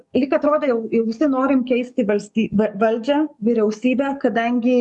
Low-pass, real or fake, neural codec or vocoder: 10.8 kHz; fake; codec, 44.1 kHz, 7.8 kbps, DAC